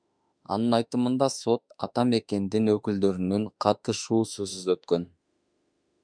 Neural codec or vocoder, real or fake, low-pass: autoencoder, 48 kHz, 32 numbers a frame, DAC-VAE, trained on Japanese speech; fake; 9.9 kHz